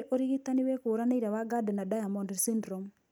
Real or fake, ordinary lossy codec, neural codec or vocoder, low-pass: real; none; none; none